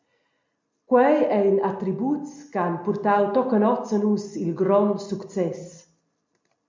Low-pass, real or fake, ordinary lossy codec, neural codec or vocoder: 7.2 kHz; real; MP3, 64 kbps; none